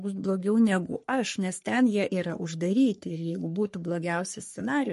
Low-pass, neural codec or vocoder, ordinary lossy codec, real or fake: 14.4 kHz; codec, 44.1 kHz, 3.4 kbps, Pupu-Codec; MP3, 48 kbps; fake